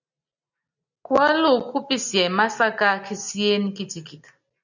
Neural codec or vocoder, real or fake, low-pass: none; real; 7.2 kHz